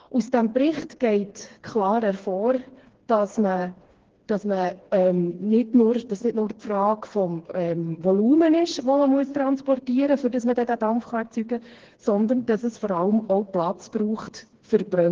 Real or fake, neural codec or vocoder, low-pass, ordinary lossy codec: fake; codec, 16 kHz, 2 kbps, FreqCodec, smaller model; 7.2 kHz; Opus, 16 kbps